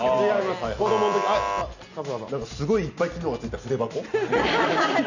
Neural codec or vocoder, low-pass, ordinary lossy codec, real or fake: none; 7.2 kHz; none; real